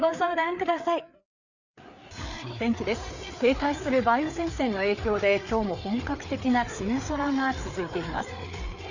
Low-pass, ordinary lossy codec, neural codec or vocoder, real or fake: 7.2 kHz; none; codec, 16 kHz, 4 kbps, FreqCodec, larger model; fake